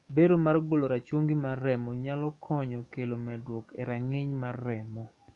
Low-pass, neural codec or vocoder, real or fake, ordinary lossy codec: 10.8 kHz; codec, 44.1 kHz, 7.8 kbps, DAC; fake; none